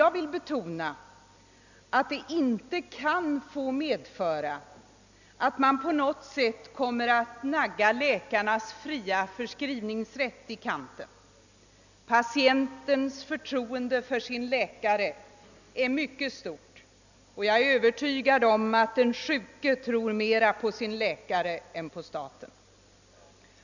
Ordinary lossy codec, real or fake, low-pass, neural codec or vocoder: none; real; 7.2 kHz; none